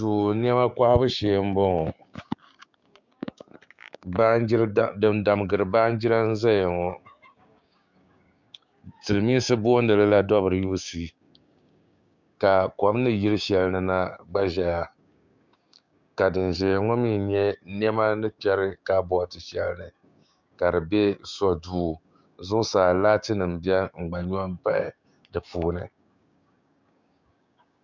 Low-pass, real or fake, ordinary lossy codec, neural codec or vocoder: 7.2 kHz; fake; MP3, 64 kbps; codec, 16 kHz, 6 kbps, DAC